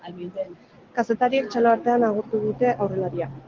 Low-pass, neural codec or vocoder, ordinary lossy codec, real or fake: 7.2 kHz; none; Opus, 16 kbps; real